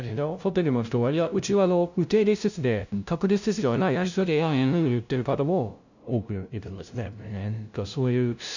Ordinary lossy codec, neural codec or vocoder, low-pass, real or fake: none; codec, 16 kHz, 0.5 kbps, FunCodec, trained on LibriTTS, 25 frames a second; 7.2 kHz; fake